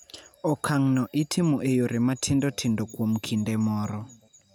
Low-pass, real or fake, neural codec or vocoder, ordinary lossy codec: none; real; none; none